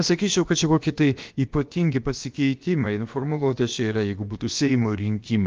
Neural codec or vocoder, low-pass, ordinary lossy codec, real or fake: codec, 16 kHz, about 1 kbps, DyCAST, with the encoder's durations; 7.2 kHz; Opus, 32 kbps; fake